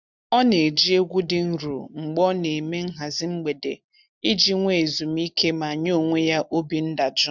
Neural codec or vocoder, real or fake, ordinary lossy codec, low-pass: none; real; none; 7.2 kHz